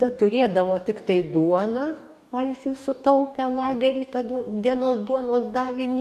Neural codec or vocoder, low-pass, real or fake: codec, 44.1 kHz, 2.6 kbps, DAC; 14.4 kHz; fake